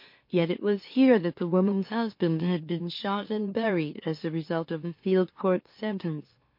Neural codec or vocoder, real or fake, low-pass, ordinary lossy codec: autoencoder, 44.1 kHz, a latent of 192 numbers a frame, MeloTTS; fake; 5.4 kHz; MP3, 32 kbps